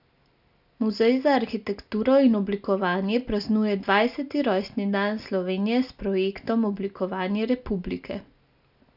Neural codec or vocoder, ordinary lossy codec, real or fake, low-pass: none; none; real; 5.4 kHz